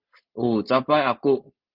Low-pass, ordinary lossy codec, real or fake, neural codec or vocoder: 5.4 kHz; Opus, 32 kbps; real; none